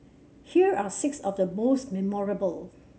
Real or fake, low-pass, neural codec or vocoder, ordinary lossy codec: real; none; none; none